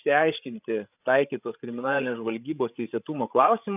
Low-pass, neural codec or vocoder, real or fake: 3.6 kHz; codec, 16 kHz, 8 kbps, FreqCodec, larger model; fake